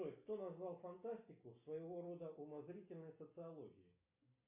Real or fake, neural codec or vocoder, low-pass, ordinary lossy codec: real; none; 3.6 kHz; AAC, 32 kbps